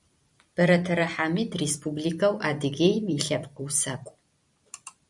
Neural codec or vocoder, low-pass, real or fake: vocoder, 44.1 kHz, 128 mel bands every 256 samples, BigVGAN v2; 10.8 kHz; fake